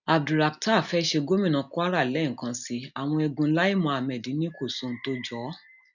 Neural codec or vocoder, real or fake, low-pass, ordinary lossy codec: none; real; 7.2 kHz; none